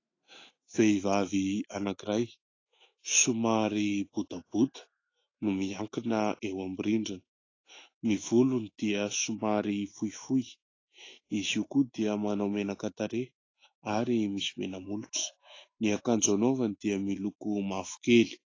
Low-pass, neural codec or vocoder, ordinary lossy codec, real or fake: 7.2 kHz; autoencoder, 48 kHz, 128 numbers a frame, DAC-VAE, trained on Japanese speech; AAC, 32 kbps; fake